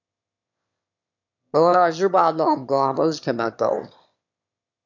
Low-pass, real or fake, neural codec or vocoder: 7.2 kHz; fake; autoencoder, 22.05 kHz, a latent of 192 numbers a frame, VITS, trained on one speaker